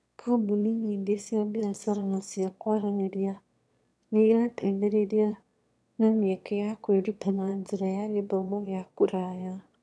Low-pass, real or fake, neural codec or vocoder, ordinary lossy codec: none; fake; autoencoder, 22.05 kHz, a latent of 192 numbers a frame, VITS, trained on one speaker; none